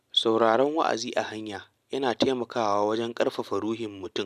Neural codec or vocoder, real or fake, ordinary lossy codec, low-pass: none; real; none; 14.4 kHz